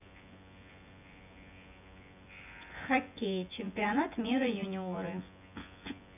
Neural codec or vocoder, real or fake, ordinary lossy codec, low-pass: vocoder, 24 kHz, 100 mel bands, Vocos; fake; none; 3.6 kHz